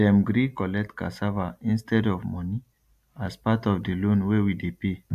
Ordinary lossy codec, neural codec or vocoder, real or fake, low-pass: AAC, 96 kbps; none; real; 14.4 kHz